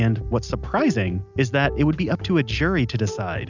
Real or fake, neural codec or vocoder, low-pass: real; none; 7.2 kHz